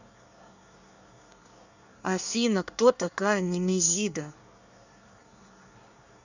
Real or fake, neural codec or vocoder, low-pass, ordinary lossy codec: fake; codec, 24 kHz, 1 kbps, SNAC; 7.2 kHz; none